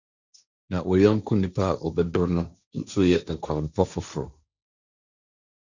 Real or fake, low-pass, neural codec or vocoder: fake; 7.2 kHz; codec, 16 kHz, 1.1 kbps, Voila-Tokenizer